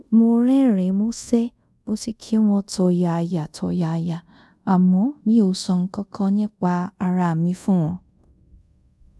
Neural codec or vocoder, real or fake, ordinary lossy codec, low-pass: codec, 24 kHz, 0.5 kbps, DualCodec; fake; none; none